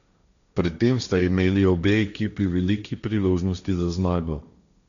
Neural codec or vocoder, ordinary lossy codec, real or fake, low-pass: codec, 16 kHz, 1.1 kbps, Voila-Tokenizer; none; fake; 7.2 kHz